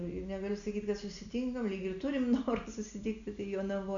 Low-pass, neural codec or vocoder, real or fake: 7.2 kHz; none; real